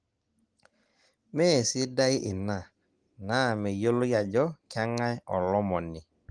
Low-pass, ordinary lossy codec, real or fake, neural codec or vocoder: 9.9 kHz; Opus, 24 kbps; real; none